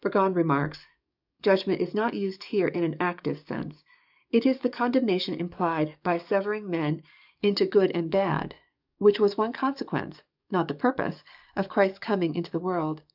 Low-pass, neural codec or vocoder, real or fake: 5.4 kHz; codec, 44.1 kHz, 7.8 kbps, DAC; fake